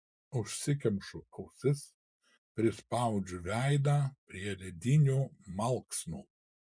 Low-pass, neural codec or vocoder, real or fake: 9.9 kHz; none; real